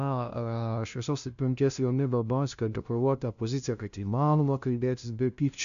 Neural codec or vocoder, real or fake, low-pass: codec, 16 kHz, 0.5 kbps, FunCodec, trained on LibriTTS, 25 frames a second; fake; 7.2 kHz